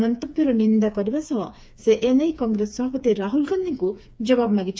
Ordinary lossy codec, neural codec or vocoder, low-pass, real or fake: none; codec, 16 kHz, 4 kbps, FreqCodec, smaller model; none; fake